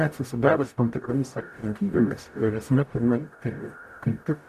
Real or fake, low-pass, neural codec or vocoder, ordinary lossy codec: fake; 14.4 kHz; codec, 44.1 kHz, 0.9 kbps, DAC; AAC, 96 kbps